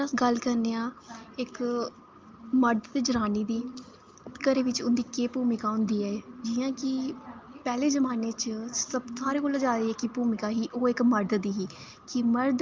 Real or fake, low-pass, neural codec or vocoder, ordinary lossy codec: real; 7.2 kHz; none; Opus, 32 kbps